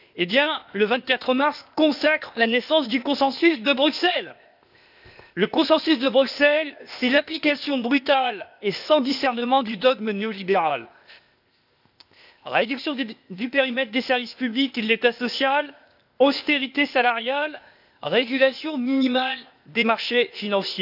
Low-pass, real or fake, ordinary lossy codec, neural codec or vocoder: 5.4 kHz; fake; none; codec, 16 kHz, 0.8 kbps, ZipCodec